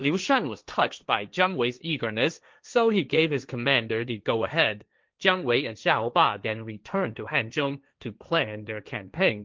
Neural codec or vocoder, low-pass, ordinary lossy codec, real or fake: codec, 16 kHz, 1.1 kbps, Voila-Tokenizer; 7.2 kHz; Opus, 32 kbps; fake